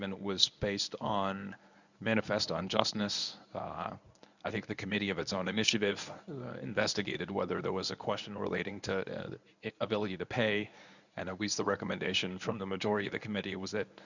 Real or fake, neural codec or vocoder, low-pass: fake; codec, 24 kHz, 0.9 kbps, WavTokenizer, medium speech release version 1; 7.2 kHz